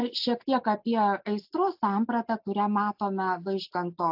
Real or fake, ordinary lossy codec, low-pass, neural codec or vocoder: real; AAC, 48 kbps; 5.4 kHz; none